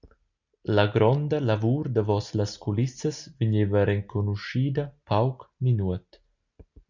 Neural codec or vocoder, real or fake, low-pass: none; real; 7.2 kHz